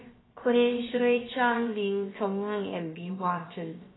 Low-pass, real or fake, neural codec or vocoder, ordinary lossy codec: 7.2 kHz; fake; codec, 16 kHz, about 1 kbps, DyCAST, with the encoder's durations; AAC, 16 kbps